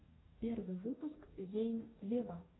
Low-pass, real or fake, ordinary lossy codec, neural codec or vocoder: 7.2 kHz; fake; AAC, 16 kbps; codec, 44.1 kHz, 2.6 kbps, DAC